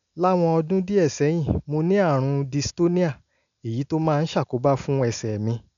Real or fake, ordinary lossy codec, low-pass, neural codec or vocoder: real; none; 7.2 kHz; none